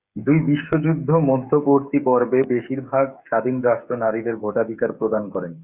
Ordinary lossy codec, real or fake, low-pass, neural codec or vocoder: Opus, 64 kbps; fake; 3.6 kHz; codec, 16 kHz, 8 kbps, FreqCodec, smaller model